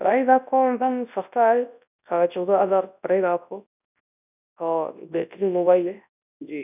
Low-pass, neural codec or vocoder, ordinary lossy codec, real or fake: 3.6 kHz; codec, 24 kHz, 0.9 kbps, WavTokenizer, large speech release; none; fake